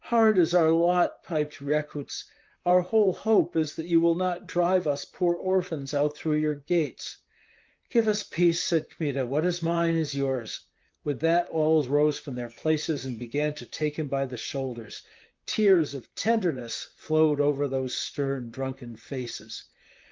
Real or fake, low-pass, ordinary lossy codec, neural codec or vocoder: fake; 7.2 kHz; Opus, 32 kbps; vocoder, 44.1 kHz, 128 mel bands, Pupu-Vocoder